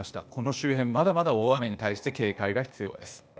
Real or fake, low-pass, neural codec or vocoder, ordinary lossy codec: fake; none; codec, 16 kHz, 0.8 kbps, ZipCodec; none